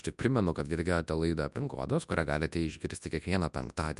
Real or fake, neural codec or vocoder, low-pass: fake; codec, 24 kHz, 0.9 kbps, WavTokenizer, large speech release; 10.8 kHz